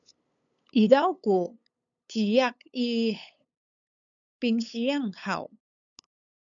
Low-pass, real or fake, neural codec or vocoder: 7.2 kHz; fake; codec, 16 kHz, 8 kbps, FunCodec, trained on LibriTTS, 25 frames a second